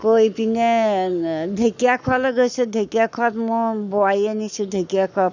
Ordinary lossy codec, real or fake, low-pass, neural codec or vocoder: none; fake; 7.2 kHz; codec, 44.1 kHz, 7.8 kbps, Pupu-Codec